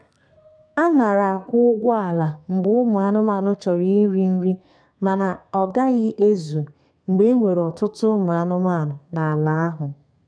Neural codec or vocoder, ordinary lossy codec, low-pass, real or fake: codec, 32 kHz, 1.9 kbps, SNAC; none; 9.9 kHz; fake